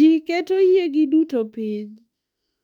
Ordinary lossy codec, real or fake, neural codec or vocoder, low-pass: none; fake; autoencoder, 48 kHz, 32 numbers a frame, DAC-VAE, trained on Japanese speech; 19.8 kHz